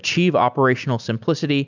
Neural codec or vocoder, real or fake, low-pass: none; real; 7.2 kHz